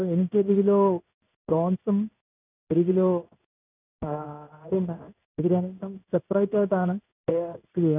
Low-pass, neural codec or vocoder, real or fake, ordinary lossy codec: 3.6 kHz; codec, 16 kHz in and 24 kHz out, 1 kbps, XY-Tokenizer; fake; none